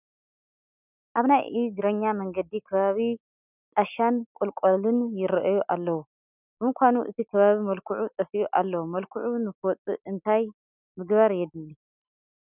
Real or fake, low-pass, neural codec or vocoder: real; 3.6 kHz; none